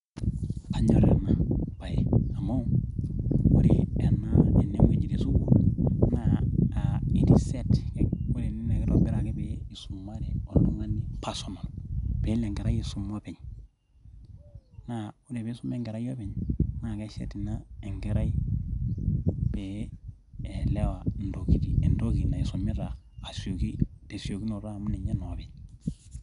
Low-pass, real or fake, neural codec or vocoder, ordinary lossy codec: 10.8 kHz; real; none; none